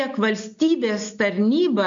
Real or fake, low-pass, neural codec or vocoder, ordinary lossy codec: real; 7.2 kHz; none; MP3, 48 kbps